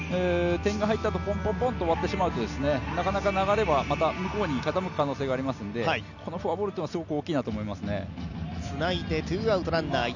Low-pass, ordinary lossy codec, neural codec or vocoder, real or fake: 7.2 kHz; none; none; real